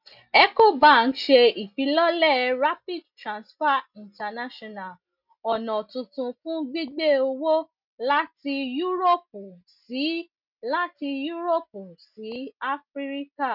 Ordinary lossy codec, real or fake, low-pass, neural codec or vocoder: none; real; 5.4 kHz; none